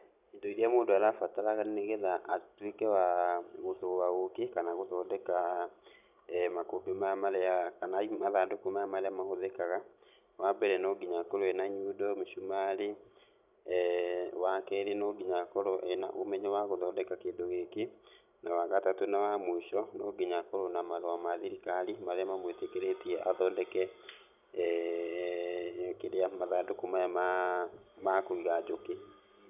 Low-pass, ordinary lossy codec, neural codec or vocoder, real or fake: 3.6 kHz; none; none; real